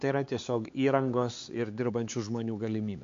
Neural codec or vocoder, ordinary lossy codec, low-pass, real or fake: codec, 16 kHz, 4 kbps, X-Codec, HuBERT features, trained on LibriSpeech; MP3, 48 kbps; 7.2 kHz; fake